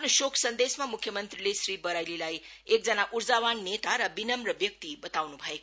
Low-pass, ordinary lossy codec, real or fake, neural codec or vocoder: none; none; real; none